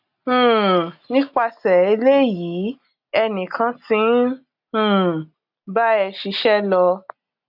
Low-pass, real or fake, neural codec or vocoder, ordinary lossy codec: 5.4 kHz; real; none; AAC, 48 kbps